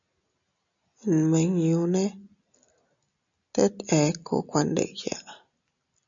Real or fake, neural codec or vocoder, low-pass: real; none; 7.2 kHz